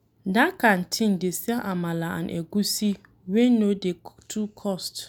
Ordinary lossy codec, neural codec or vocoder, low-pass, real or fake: none; none; none; real